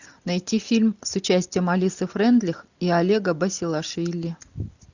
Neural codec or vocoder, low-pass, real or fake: none; 7.2 kHz; real